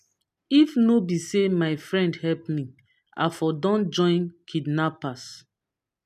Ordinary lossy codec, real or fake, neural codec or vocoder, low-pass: none; real; none; 14.4 kHz